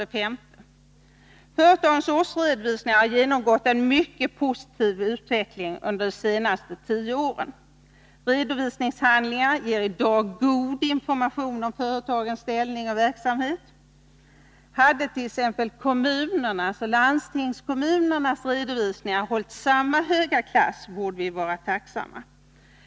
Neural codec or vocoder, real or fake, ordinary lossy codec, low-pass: none; real; none; none